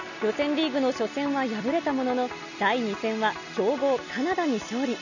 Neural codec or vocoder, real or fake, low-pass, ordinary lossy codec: none; real; 7.2 kHz; none